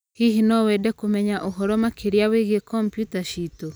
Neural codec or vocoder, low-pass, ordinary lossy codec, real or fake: none; none; none; real